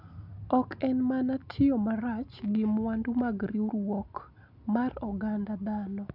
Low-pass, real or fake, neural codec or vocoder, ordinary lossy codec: 5.4 kHz; real; none; none